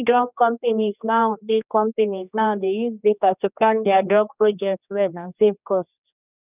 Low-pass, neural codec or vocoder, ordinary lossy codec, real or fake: 3.6 kHz; codec, 16 kHz, 2 kbps, X-Codec, HuBERT features, trained on general audio; none; fake